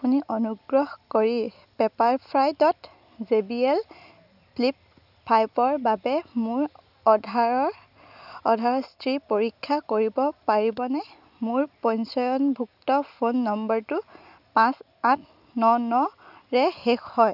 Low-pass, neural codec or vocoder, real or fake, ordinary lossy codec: 5.4 kHz; none; real; none